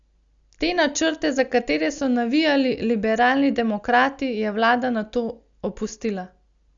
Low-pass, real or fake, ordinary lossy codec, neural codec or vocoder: 7.2 kHz; real; Opus, 64 kbps; none